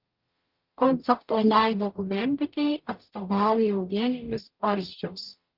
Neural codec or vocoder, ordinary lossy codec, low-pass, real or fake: codec, 44.1 kHz, 0.9 kbps, DAC; Opus, 32 kbps; 5.4 kHz; fake